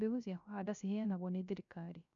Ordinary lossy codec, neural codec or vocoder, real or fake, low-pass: MP3, 96 kbps; codec, 16 kHz, 0.3 kbps, FocalCodec; fake; 7.2 kHz